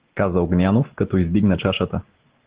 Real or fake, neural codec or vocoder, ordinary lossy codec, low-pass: real; none; Opus, 16 kbps; 3.6 kHz